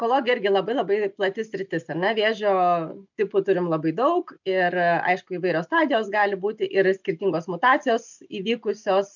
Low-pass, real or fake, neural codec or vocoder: 7.2 kHz; real; none